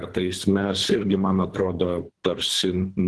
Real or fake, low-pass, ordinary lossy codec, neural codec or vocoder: fake; 10.8 kHz; Opus, 16 kbps; codec, 24 kHz, 3 kbps, HILCodec